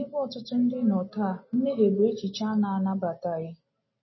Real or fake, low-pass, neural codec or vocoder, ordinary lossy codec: real; 7.2 kHz; none; MP3, 24 kbps